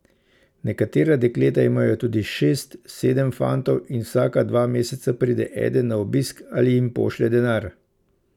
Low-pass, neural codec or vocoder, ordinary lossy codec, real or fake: 19.8 kHz; none; none; real